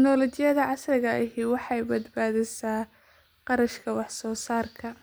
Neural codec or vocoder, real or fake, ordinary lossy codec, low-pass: none; real; none; none